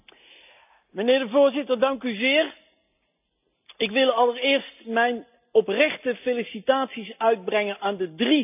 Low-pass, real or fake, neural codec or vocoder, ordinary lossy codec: 3.6 kHz; real; none; none